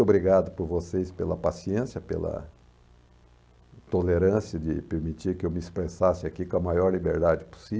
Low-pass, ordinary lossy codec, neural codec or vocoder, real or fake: none; none; none; real